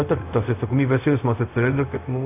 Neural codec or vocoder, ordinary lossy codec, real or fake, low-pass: codec, 16 kHz, 0.4 kbps, LongCat-Audio-Codec; AAC, 24 kbps; fake; 3.6 kHz